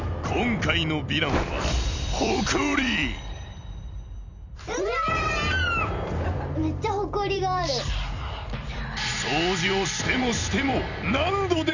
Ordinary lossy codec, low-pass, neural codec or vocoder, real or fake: none; 7.2 kHz; vocoder, 44.1 kHz, 128 mel bands every 256 samples, BigVGAN v2; fake